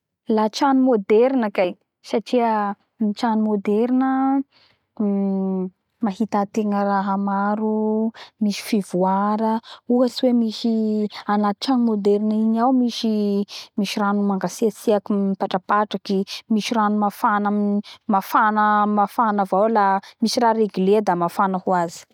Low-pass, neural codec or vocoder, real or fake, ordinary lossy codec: 19.8 kHz; none; real; none